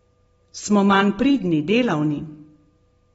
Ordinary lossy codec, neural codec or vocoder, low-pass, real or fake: AAC, 24 kbps; none; 19.8 kHz; real